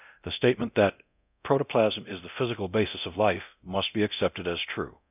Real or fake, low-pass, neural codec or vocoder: fake; 3.6 kHz; codec, 24 kHz, 0.9 kbps, DualCodec